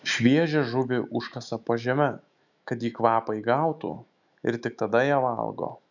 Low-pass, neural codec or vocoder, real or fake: 7.2 kHz; autoencoder, 48 kHz, 128 numbers a frame, DAC-VAE, trained on Japanese speech; fake